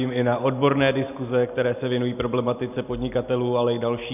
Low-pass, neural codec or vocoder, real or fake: 3.6 kHz; none; real